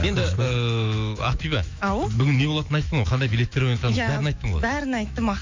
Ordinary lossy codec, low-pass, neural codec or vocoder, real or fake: MP3, 64 kbps; 7.2 kHz; autoencoder, 48 kHz, 128 numbers a frame, DAC-VAE, trained on Japanese speech; fake